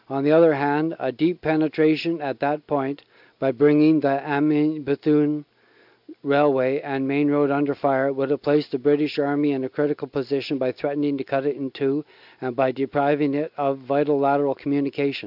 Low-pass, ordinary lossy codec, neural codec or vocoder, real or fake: 5.4 kHz; AAC, 48 kbps; none; real